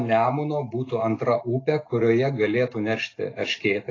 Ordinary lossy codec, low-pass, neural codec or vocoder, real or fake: AAC, 32 kbps; 7.2 kHz; none; real